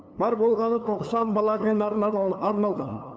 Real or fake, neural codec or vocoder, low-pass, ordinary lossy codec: fake; codec, 16 kHz, 2 kbps, FunCodec, trained on LibriTTS, 25 frames a second; none; none